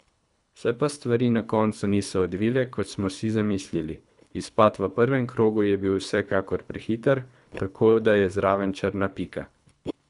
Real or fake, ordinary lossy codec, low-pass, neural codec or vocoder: fake; none; 10.8 kHz; codec, 24 kHz, 3 kbps, HILCodec